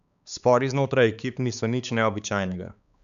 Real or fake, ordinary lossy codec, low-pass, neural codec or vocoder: fake; none; 7.2 kHz; codec, 16 kHz, 4 kbps, X-Codec, HuBERT features, trained on balanced general audio